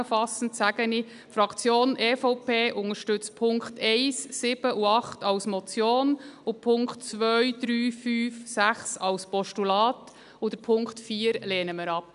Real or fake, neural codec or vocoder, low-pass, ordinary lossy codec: real; none; 10.8 kHz; none